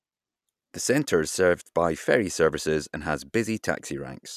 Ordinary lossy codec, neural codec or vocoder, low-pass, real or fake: none; none; 14.4 kHz; real